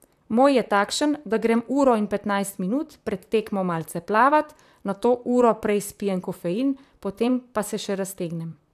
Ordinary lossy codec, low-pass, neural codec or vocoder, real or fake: AAC, 96 kbps; 14.4 kHz; vocoder, 44.1 kHz, 128 mel bands, Pupu-Vocoder; fake